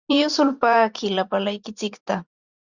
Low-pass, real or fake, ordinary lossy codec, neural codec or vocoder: 7.2 kHz; fake; Opus, 64 kbps; vocoder, 44.1 kHz, 128 mel bands every 512 samples, BigVGAN v2